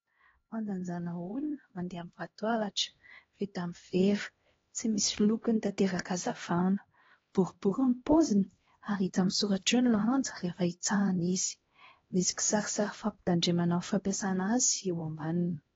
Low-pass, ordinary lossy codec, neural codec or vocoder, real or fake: 7.2 kHz; AAC, 24 kbps; codec, 16 kHz, 1 kbps, X-Codec, HuBERT features, trained on LibriSpeech; fake